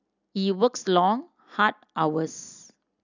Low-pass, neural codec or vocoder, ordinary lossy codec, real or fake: 7.2 kHz; none; none; real